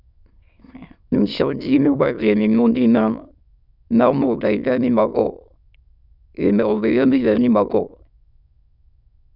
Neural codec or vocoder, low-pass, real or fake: autoencoder, 22.05 kHz, a latent of 192 numbers a frame, VITS, trained on many speakers; 5.4 kHz; fake